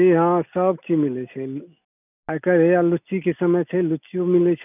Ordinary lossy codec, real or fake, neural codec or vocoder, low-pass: none; real; none; 3.6 kHz